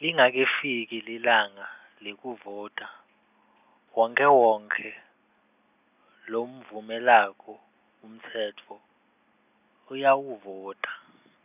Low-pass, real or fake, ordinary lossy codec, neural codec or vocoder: 3.6 kHz; real; none; none